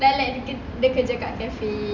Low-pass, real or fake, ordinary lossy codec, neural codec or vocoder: 7.2 kHz; real; none; none